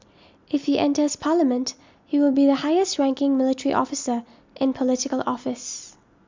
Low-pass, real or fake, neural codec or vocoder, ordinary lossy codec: 7.2 kHz; real; none; MP3, 64 kbps